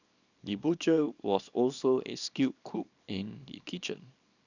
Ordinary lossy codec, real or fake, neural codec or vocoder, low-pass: none; fake; codec, 24 kHz, 0.9 kbps, WavTokenizer, small release; 7.2 kHz